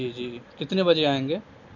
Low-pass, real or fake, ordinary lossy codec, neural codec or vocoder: 7.2 kHz; real; none; none